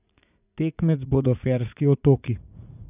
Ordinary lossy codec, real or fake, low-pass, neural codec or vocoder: none; fake; 3.6 kHz; vocoder, 44.1 kHz, 80 mel bands, Vocos